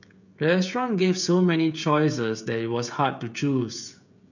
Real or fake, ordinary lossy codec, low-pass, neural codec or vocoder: fake; none; 7.2 kHz; codec, 16 kHz, 16 kbps, FreqCodec, smaller model